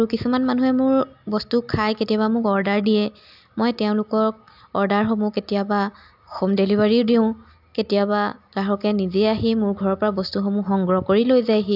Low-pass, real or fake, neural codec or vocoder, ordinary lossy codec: 5.4 kHz; real; none; AAC, 48 kbps